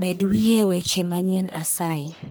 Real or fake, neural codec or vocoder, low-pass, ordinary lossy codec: fake; codec, 44.1 kHz, 1.7 kbps, Pupu-Codec; none; none